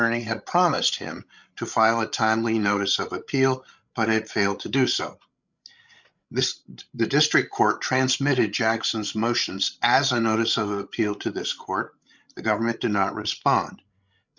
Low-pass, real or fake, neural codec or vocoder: 7.2 kHz; fake; codec, 16 kHz, 16 kbps, FreqCodec, larger model